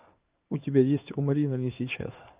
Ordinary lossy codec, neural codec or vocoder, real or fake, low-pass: Opus, 24 kbps; codec, 16 kHz in and 24 kHz out, 1 kbps, XY-Tokenizer; fake; 3.6 kHz